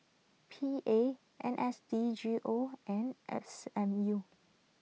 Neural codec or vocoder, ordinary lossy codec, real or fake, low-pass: none; none; real; none